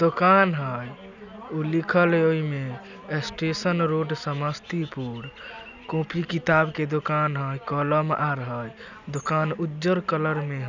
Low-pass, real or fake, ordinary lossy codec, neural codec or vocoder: 7.2 kHz; real; none; none